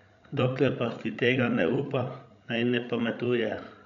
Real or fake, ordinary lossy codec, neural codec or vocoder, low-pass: fake; none; codec, 16 kHz, 16 kbps, FreqCodec, larger model; 7.2 kHz